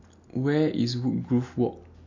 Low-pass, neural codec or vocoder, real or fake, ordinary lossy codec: 7.2 kHz; none; real; MP3, 48 kbps